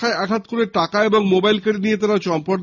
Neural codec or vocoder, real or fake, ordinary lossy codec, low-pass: none; real; none; 7.2 kHz